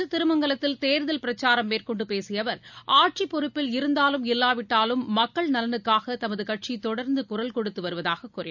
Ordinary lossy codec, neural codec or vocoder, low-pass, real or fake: none; none; 7.2 kHz; real